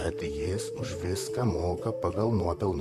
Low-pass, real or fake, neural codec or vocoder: 14.4 kHz; fake; vocoder, 44.1 kHz, 128 mel bands, Pupu-Vocoder